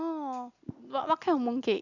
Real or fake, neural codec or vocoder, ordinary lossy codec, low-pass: real; none; none; 7.2 kHz